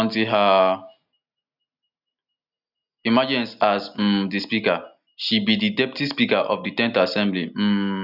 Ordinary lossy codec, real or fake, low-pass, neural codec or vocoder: none; real; 5.4 kHz; none